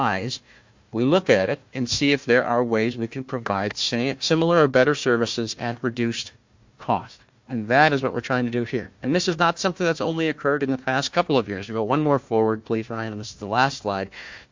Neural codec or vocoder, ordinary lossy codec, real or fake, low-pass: codec, 16 kHz, 1 kbps, FunCodec, trained on Chinese and English, 50 frames a second; MP3, 48 kbps; fake; 7.2 kHz